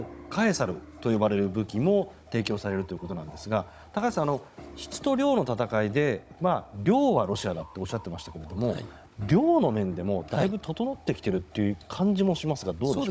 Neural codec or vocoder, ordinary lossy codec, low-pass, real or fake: codec, 16 kHz, 16 kbps, FunCodec, trained on Chinese and English, 50 frames a second; none; none; fake